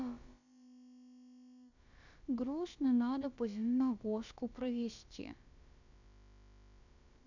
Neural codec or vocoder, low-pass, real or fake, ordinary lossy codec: codec, 16 kHz, about 1 kbps, DyCAST, with the encoder's durations; 7.2 kHz; fake; none